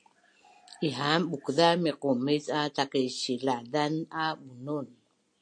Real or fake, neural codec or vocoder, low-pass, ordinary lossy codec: real; none; 9.9 kHz; MP3, 48 kbps